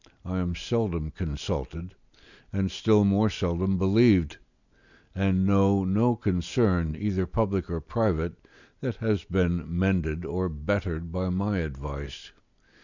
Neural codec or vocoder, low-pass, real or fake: none; 7.2 kHz; real